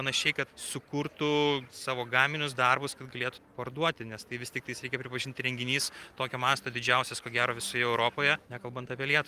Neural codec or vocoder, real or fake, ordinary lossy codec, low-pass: none; real; Opus, 32 kbps; 14.4 kHz